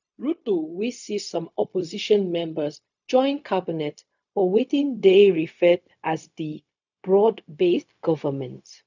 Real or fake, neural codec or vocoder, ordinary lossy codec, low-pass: fake; codec, 16 kHz, 0.4 kbps, LongCat-Audio-Codec; none; 7.2 kHz